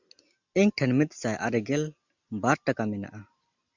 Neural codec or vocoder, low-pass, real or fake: none; 7.2 kHz; real